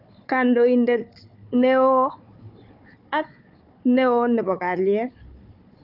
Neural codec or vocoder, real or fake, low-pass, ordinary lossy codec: codec, 16 kHz, 4 kbps, FunCodec, trained on Chinese and English, 50 frames a second; fake; 5.4 kHz; none